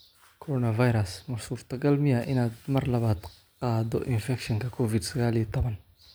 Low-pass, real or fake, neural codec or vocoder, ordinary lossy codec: none; real; none; none